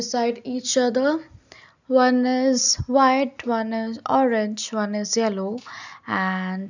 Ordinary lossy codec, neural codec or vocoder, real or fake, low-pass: none; none; real; 7.2 kHz